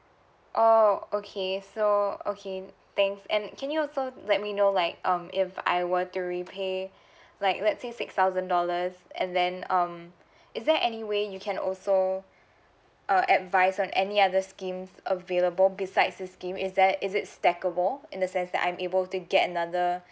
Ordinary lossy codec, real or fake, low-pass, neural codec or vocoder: none; real; none; none